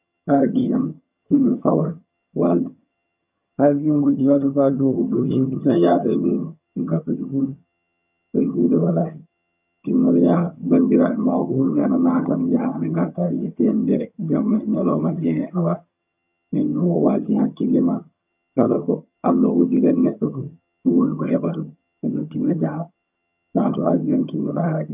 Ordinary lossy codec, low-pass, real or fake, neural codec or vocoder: none; 3.6 kHz; fake; vocoder, 22.05 kHz, 80 mel bands, HiFi-GAN